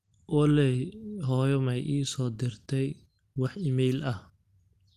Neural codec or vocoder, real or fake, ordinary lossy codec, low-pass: none; real; Opus, 24 kbps; 14.4 kHz